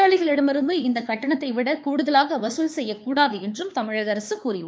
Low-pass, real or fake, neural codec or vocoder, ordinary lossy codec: none; fake; codec, 16 kHz, 4 kbps, X-Codec, HuBERT features, trained on LibriSpeech; none